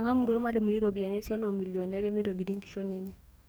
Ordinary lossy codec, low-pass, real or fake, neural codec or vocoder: none; none; fake; codec, 44.1 kHz, 2.6 kbps, DAC